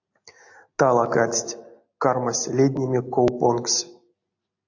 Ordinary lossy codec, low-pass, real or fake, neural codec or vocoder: AAC, 48 kbps; 7.2 kHz; real; none